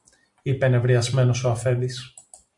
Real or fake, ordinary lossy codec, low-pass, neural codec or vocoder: real; MP3, 64 kbps; 10.8 kHz; none